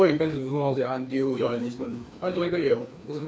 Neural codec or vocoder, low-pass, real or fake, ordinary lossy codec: codec, 16 kHz, 2 kbps, FreqCodec, larger model; none; fake; none